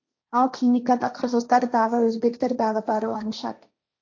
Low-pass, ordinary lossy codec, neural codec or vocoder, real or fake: 7.2 kHz; AAC, 48 kbps; codec, 16 kHz, 1.1 kbps, Voila-Tokenizer; fake